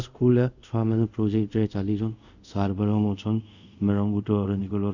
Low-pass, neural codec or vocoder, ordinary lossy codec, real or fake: 7.2 kHz; codec, 24 kHz, 0.5 kbps, DualCodec; none; fake